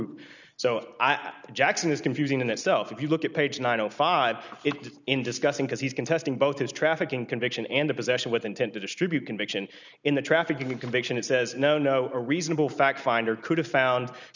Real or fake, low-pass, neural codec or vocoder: real; 7.2 kHz; none